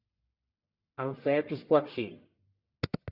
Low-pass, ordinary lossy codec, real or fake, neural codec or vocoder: 5.4 kHz; Opus, 64 kbps; fake; codec, 44.1 kHz, 1.7 kbps, Pupu-Codec